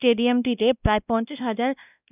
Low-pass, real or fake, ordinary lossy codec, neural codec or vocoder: 3.6 kHz; fake; none; codec, 16 kHz, 1 kbps, X-Codec, WavLM features, trained on Multilingual LibriSpeech